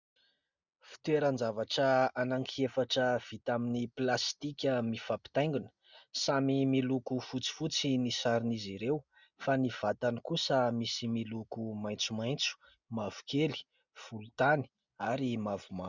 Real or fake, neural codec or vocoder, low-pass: real; none; 7.2 kHz